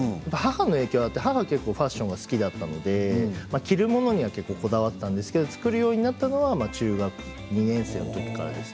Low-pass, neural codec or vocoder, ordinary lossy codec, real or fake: none; none; none; real